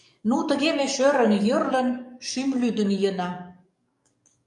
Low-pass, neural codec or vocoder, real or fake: 10.8 kHz; codec, 44.1 kHz, 7.8 kbps, DAC; fake